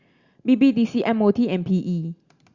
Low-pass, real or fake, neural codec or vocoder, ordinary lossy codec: 7.2 kHz; real; none; Opus, 64 kbps